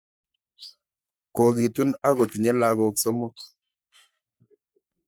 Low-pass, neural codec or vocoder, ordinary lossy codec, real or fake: none; codec, 44.1 kHz, 3.4 kbps, Pupu-Codec; none; fake